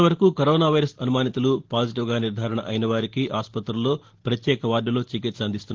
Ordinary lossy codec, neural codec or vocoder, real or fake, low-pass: Opus, 16 kbps; none; real; 7.2 kHz